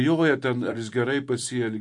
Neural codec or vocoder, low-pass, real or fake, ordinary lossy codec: vocoder, 44.1 kHz, 128 mel bands every 256 samples, BigVGAN v2; 10.8 kHz; fake; MP3, 48 kbps